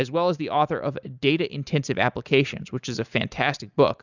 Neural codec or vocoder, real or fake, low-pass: none; real; 7.2 kHz